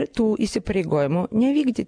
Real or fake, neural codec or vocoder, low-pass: real; none; 9.9 kHz